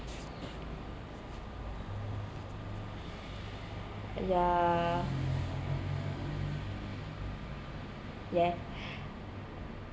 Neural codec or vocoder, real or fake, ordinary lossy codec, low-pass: none; real; none; none